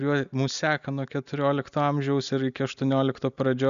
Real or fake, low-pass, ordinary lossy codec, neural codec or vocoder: real; 7.2 kHz; MP3, 96 kbps; none